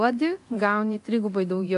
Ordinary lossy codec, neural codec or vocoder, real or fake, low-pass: AAC, 64 kbps; codec, 24 kHz, 0.9 kbps, DualCodec; fake; 10.8 kHz